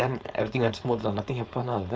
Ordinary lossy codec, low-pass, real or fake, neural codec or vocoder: none; none; fake; codec, 16 kHz, 4.8 kbps, FACodec